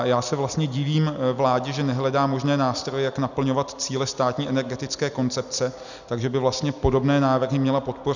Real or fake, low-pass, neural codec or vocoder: real; 7.2 kHz; none